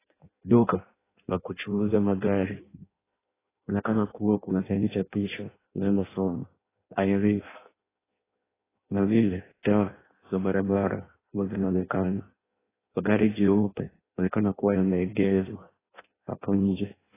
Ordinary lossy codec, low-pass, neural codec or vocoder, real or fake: AAC, 16 kbps; 3.6 kHz; codec, 16 kHz in and 24 kHz out, 0.6 kbps, FireRedTTS-2 codec; fake